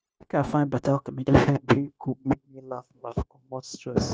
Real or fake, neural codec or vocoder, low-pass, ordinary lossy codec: fake; codec, 16 kHz, 0.9 kbps, LongCat-Audio-Codec; none; none